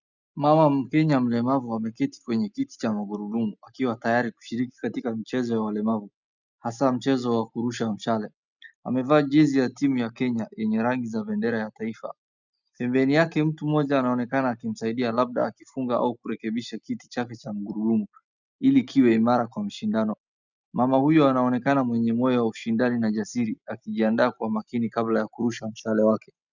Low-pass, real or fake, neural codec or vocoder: 7.2 kHz; real; none